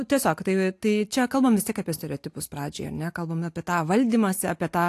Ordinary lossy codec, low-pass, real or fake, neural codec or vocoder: AAC, 64 kbps; 14.4 kHz; real; none